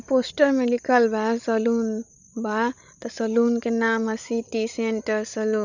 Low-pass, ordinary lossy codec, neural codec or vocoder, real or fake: 7.2 kHz; none; codec, 16 kHz, 16 kbps, FreqCodec, larger model; fake